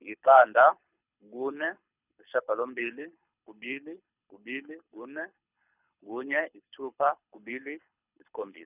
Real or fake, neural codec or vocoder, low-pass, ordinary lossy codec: fake; codec, 24 kHz, 6 kbps, HILCodec; 3.6 kHz; none